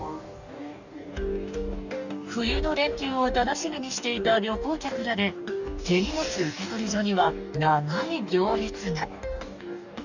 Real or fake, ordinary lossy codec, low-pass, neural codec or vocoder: fake; none; 7.2 kHz; codec, 44.1 kHz, 2.6 kbps, DAC